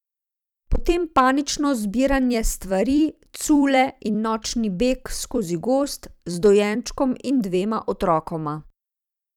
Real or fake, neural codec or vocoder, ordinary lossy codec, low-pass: fake; vocoder, 44.1 kHz, 128 mel bands every 256 samples, BigVGAN v2; none; 19.8 kHz